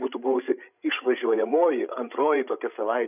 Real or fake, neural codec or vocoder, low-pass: fake; codec, 16 kHz, 16 kbps, FreqCodec, larger model; 3.6 kHz